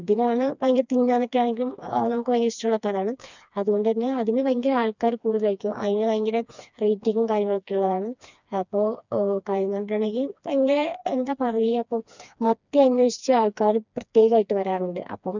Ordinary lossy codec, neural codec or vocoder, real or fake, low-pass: none; codec, 16 kHz, 2 kbps, FreqCodec, smaller model; fake; 7.2 kHz